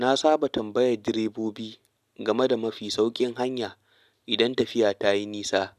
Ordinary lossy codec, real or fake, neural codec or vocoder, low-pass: none; real; none; 14.4 kHz